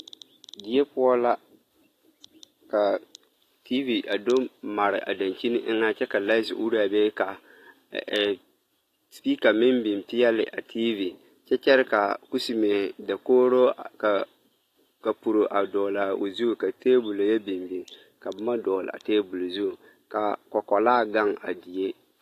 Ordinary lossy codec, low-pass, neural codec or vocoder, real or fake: AAC, 48 kbps; 14.4 kHz; none; real